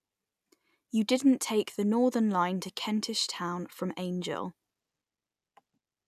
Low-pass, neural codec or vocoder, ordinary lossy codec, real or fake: 14.4 kHz; none; none; real